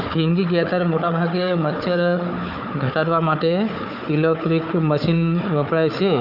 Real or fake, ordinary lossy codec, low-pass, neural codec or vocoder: fake; none; 5.4 kHz; codec, 16 kHz, 4 kbps, FunCodec, trained on Chinese and English, 50 frames a second